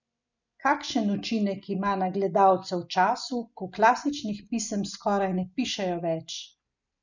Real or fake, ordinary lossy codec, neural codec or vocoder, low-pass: real; none; none; 7.2 kHz